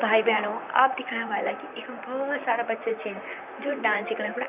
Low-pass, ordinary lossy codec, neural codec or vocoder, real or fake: 3.6 kHz; none; vocoder, 44.1 kHz, 128 mel bands, Pupu-Vocoder; fake